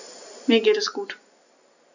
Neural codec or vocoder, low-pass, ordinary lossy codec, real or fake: none; 7.2 kHz; none; real